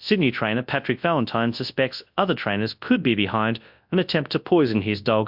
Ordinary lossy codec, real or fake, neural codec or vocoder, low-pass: MP3, 48 kbps; fake; codec, 24 kHz, 0.9 kbps, WavTokenizer, large speech release; 5.4 kHz